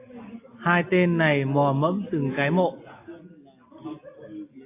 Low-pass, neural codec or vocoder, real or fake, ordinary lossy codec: 3.6 kHz; none; real; AAC, 24 kbps